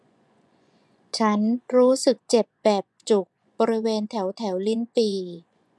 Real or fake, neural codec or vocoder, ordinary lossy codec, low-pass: fake; vocoder, 24 kHz, 100 mel bands, Vocos; none; none